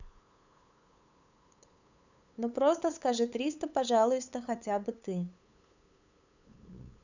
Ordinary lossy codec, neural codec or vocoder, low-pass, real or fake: none; codec, 16 kHz, 8 kbps, FunCodec, trained on LibriTTS, 25 frames a second; 7.2 kHz; fake